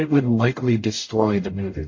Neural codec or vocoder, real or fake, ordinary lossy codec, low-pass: codec, 44.1 kHz, 0.9 kbps, DAC; fake; MP3, 32 kbps; 7.2 kHz